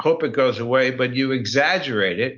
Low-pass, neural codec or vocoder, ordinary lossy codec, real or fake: 7.2 kHz; none; MP3, 48 kbps; real